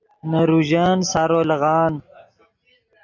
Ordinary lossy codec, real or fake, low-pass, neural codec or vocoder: AAC, 48 kbps; real; 7.2 kHz; none